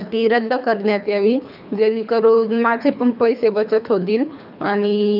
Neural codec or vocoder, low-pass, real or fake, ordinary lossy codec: codec, 24 kHz, 3 kbps, HILCodec; 5.4 kHz; fake; none